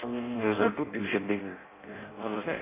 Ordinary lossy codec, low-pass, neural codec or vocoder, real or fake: AAC, 16 kbps; 3.6 kHz; codec, 16 kHz in and 24 kHz out, 0.6 kbps, FireRedTTS-2 codec; fake